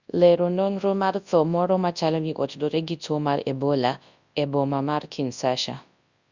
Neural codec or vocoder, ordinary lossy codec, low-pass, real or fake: codec, 24 kHz, 0.9 kbps, WavTokenizer, large speech release; Opus, 64 kbps; 7.2 kHz; fake